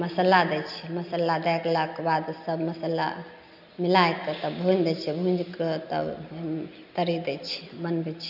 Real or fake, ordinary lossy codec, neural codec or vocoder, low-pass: real; none; none; 5.4 kHz